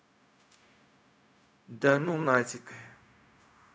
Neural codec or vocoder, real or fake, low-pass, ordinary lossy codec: codec, 16 kHz, 0.4 kbps, LongCat-Audio-Codec; fake; none; none